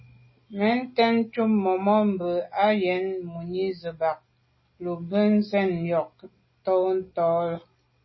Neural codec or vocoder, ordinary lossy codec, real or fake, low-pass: none; MP3, 24 kbps; real; 7.2 kHz